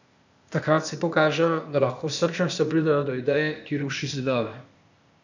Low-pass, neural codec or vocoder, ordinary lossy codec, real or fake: 7.2 kHz; codec, 16 kHz, 0.8 kbps, ZipCodec; none; fake